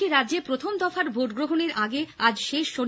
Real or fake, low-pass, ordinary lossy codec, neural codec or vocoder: real; none; none; none